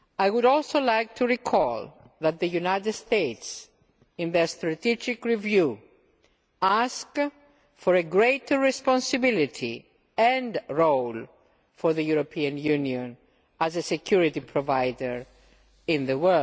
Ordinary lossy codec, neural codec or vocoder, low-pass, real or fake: none; none; none; real